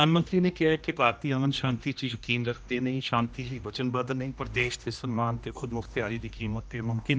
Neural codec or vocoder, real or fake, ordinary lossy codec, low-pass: codec, 16 kHz, 1 kbps, X-Codec, HuBERT features, trained on general audio; fake; none; none